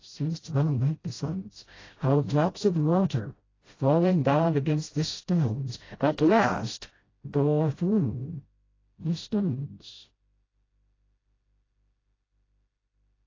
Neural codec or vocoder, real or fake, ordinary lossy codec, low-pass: codec, 16 kHz, 0.5 kbps, FreqCodec, smaller model; fake; AAC, 32 kbps; 7.2 kHz